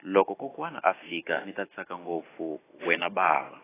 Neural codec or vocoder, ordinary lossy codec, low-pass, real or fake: none; AAC, 16 kbps; 3.6 kHz; real